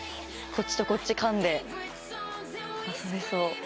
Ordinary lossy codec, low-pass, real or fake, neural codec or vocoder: none; none; real; none